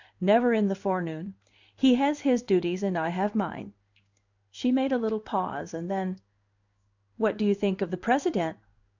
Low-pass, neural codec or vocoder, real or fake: 7.2 kHz; codec, 16 kHz in and 24 kHz out, 1 kbps, XY-Tokenizer; fake